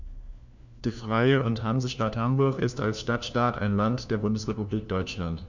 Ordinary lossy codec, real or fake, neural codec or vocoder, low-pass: none; fake; codec, 16 kHz, 1 kbps, FunCodec, trained on LibriTTS, 50 frames a second; 7.2 kHz